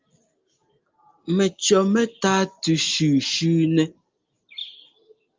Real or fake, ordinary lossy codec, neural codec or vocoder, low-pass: real; Opus, 32 kbps; none; 7.2 kHz